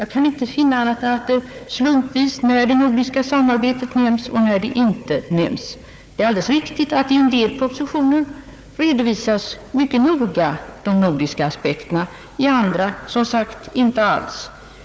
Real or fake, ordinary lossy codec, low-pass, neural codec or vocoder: fake; none; none; codec, 16 kHz, 4 kbps, FunCodec, trained on Chinese and English, 50 frames a second